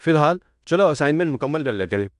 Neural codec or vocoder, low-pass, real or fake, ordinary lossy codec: codec, 16 kHz in and 24 kHz out, 0.9 kbps, LongCat-Audio-Codec, fine tuned four codebook decoder; 10.8 kHz; fake; AAC, 96 kbps